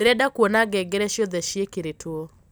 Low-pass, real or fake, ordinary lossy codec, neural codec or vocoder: none; real; none; none